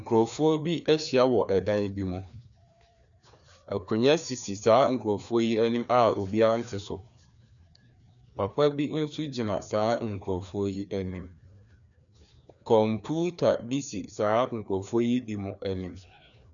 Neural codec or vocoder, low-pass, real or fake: codec, 16 kHz, 2 kbps, FreqCodec, larger model; 7.2 kHz; fake